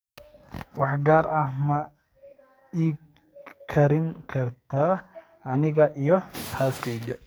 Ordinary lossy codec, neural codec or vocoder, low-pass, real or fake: none; codec, 44.1 kHz, 2.6 kbps, SNAC; none; fake